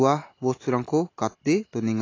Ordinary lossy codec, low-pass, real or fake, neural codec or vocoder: AAC, 32 kbps; 7.2 kHz; real; none